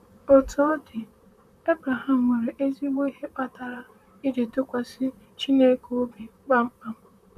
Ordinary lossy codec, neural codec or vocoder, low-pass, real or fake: none; vocoder, 44.1 kHz, 128 mel bands, Pupu-Vocoder; 14.4 kHz; fake